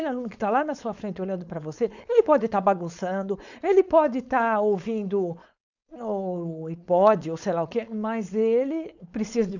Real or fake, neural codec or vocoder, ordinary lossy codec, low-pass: fake; codec, 16 kHz, 4.8 kbps, FACodec; none; 7.2 kHz